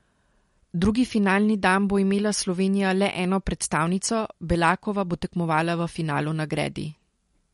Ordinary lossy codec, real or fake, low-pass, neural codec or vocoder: MP3, 48 kbps; real; 14.4 kHz; none